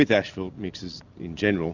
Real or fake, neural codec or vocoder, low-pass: real; none; 7.2 kHz